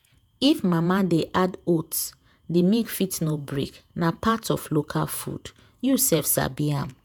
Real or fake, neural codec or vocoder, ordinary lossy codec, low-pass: fake; vocoder, 48 kHz, 128 mel bands, Vocos; none; none